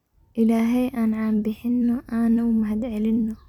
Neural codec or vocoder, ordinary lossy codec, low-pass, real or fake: vocoder, 44.1 kHz, 128 mel bands every 256 samples, BigVGAN v2; none; 19.8 kHz; fake